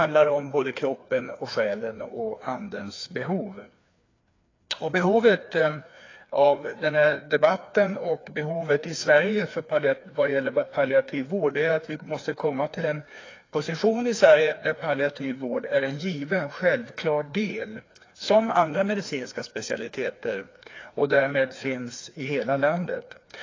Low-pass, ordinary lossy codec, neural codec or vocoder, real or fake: 7.2 kHz; AAC, 32 kbps; codec, 16 kHz, 2 kbps, FreqCodec, larger model; fake